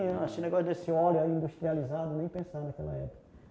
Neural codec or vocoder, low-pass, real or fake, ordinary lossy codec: none; none; real; none